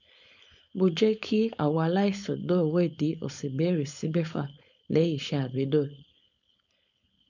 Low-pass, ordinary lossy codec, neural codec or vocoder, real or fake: 7.2 kHz; none; codec, 16 kHz, 4.8 kbps, FACodec; fake